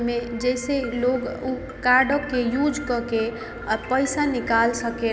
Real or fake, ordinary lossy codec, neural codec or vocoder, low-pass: real; none; none; none